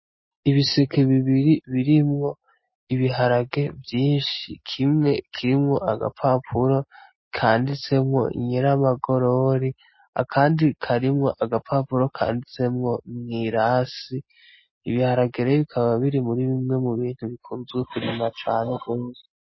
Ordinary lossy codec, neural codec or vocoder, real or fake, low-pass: MP3, 24 kbps; none; real; 7.2 kHz